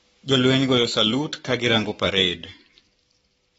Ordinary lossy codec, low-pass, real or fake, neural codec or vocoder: AAC, 24 kbps; 19.8 kHz; fake; codec, 44.1 kHz, 7.8 kbps, Pupu-Codec